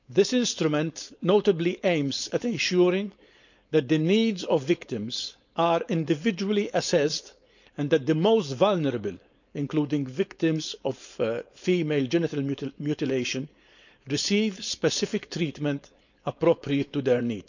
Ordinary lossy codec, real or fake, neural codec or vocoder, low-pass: none; fake; codec, 16 kHz, 4.8 kbps, FACodec; 7.2 kHz